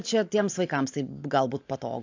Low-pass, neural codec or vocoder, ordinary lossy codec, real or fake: 7.2 kHz; none; AAC, 48 kbps; real